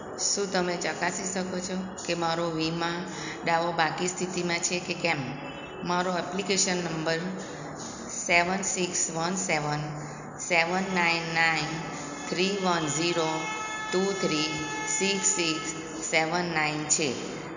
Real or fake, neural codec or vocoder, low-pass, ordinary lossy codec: real; none; 7.2 kHz; none